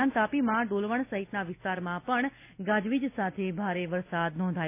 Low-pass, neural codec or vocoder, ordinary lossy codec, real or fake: 3.6 kHz; none; none; real